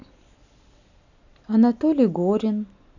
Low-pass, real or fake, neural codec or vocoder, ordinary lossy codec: 7.2 kHz; real; none; none